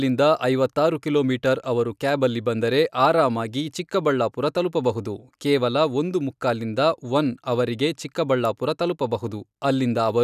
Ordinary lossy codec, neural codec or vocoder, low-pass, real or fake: none; none; 14.4 kHz; real